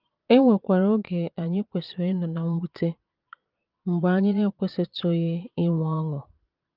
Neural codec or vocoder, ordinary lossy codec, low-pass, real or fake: vocoder, 22.05 kHz, 80 mel bands, Vocos; Opus, 24 kbps; 5.4 kHz; fake